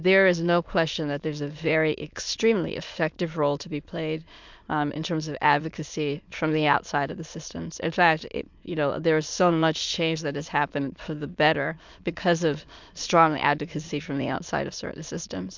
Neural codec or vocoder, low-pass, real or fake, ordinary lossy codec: autoencoder, 22.05 kHz, a latent of 192 numbers a frame, VITS, trained on many speakers; 7.2 kHz; fake; MP3, 64 kbps